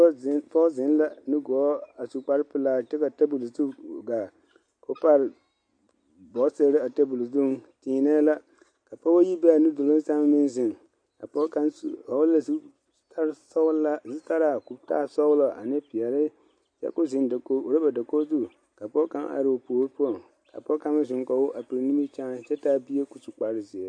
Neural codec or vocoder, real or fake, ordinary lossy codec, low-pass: none; real; MP3, 48 kbps; 9.9 kHz